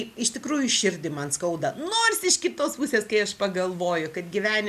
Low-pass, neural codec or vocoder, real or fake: 14.4 kHz; none; real